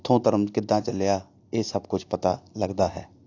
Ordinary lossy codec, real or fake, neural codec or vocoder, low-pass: AAC, 48 kbps; real; none; 7.2 kHz